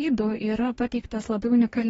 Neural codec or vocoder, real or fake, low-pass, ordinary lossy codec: codec, 44.1 kHz, 2.6 kbps, DAC; fake; 19.8 kHz; AAC, 24 kbps